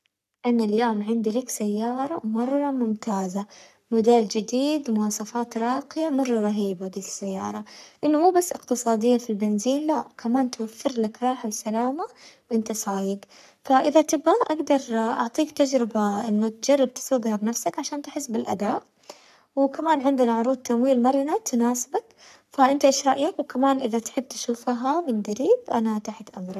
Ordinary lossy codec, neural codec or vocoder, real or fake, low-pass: none; codec, 44.1 kHz, 3.4 kbps, Pupu-Codec; fake; 14.4 kHz